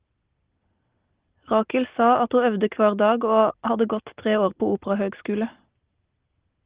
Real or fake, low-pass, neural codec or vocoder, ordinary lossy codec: real; 3.6 kHz; none; Opus, 16 kbps